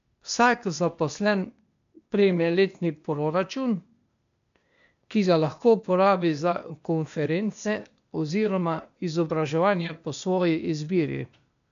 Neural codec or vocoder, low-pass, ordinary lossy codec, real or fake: codec, 16 kHz, 0.8 kbps, ZipCodec; 7.2 kHz; MP3, 64 kbps; fake